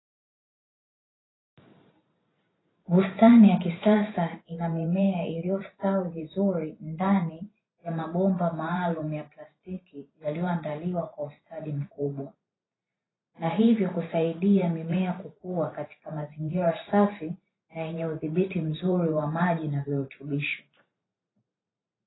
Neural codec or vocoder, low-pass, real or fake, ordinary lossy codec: vocoder, 44.1 kHz, 128 mel bands every 512 samples, BigVGAN v2; 7.2 kHz; fake; AAC, 16 kbps